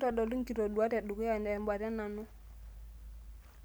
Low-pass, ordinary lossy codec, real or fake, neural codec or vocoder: none; none; real; none